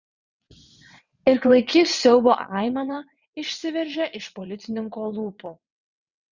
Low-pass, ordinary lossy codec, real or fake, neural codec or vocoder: 7.2 kHz; Opus, 64 kbps; fake; vocoder, 22.05 kHz, 80 mel bands, WaveNeXt